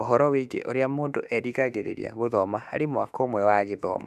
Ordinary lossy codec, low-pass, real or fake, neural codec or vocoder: none; 14.4 kHz; fake; autoencoder, 48 kHz, 32 numbers a frame, DAC-VAE, trained on Japanese speech